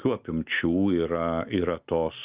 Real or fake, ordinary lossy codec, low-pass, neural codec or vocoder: real; Opus, 32 kbps; 3.6 kHz; none